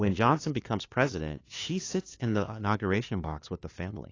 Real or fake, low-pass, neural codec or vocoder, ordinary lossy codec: fake; 7.2 kHz; codec, 16 kHz, 2 kbps, FunCodec, trained on LibriTTS, 25 frames a second; AAC, 32 kbps